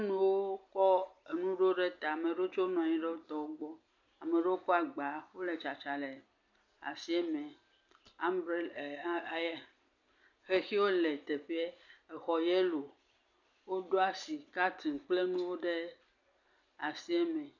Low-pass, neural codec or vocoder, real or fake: 7.2 kHz; none; real